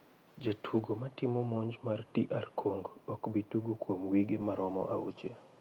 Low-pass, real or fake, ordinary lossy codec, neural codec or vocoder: 19.8 kHz; fake; Opus, 32 kbps; vocoder, 48 kHz, 128 mel bands, Vocos